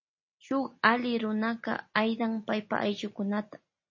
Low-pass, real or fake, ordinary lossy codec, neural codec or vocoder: 7.2 kHz; real; MP3, 32 kbps; none